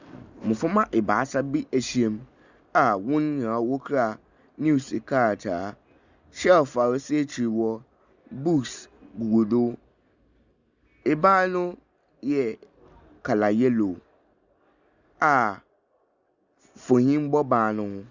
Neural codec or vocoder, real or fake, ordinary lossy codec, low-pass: none; real; Opus, 64 kbps; 7.2 kHz